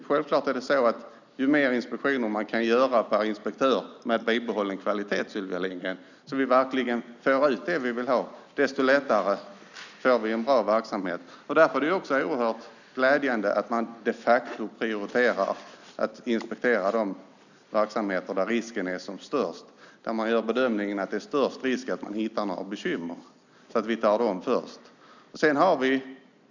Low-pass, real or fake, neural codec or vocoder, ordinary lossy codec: 7.2 kHz; real; none; none